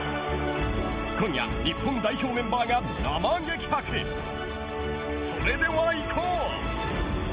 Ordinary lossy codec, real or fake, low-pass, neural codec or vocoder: Opus, 24 kbps; real; 3.6 kHz; none